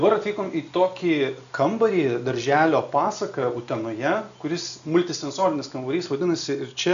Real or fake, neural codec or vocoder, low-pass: real; none; 7.2 kHz